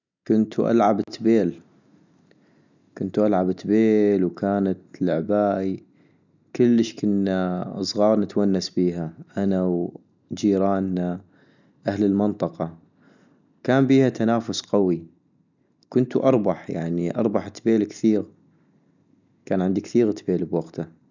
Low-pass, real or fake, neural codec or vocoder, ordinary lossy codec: 7.2 kHz; real; none; none